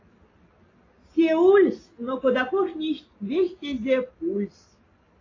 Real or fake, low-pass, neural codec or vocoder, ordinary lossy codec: real; 7.2 kHz; none; AAC, 32 kbps